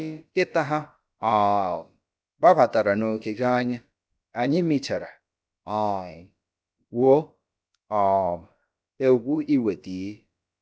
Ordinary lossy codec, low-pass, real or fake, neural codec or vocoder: none; none; fake; codec, 16 kHz, about 1 kbps, DyCAST, with the encoder's durations